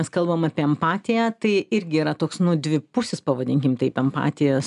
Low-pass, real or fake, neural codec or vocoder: 10.8 kHz; real; none